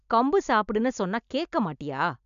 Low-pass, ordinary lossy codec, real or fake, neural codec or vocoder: 7.2 kHz; none; real; none